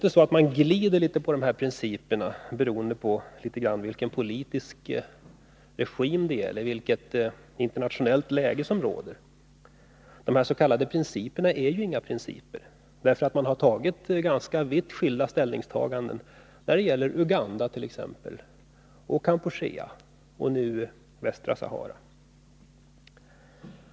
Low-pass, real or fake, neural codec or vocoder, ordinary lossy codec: none; real; none; none